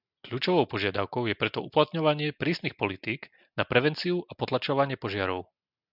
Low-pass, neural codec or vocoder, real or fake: 5.4 kHz; none; real